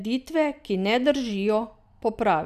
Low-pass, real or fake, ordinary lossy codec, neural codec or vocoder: 14.4 kHz; real; none; none